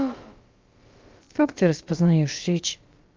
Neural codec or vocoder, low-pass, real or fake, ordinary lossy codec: codec, 16 kHz, about 1 kbps, DyCAST, with the encoder's durations; 7.2 kHz; fake; Opus, 32 kbps